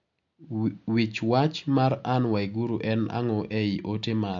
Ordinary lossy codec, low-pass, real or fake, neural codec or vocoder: MP3, 48 kbps; 7.2 kHz; real; none